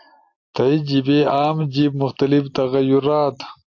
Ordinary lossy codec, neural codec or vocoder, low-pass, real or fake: AAC, 48 kbps; none; 7.2 kHz; real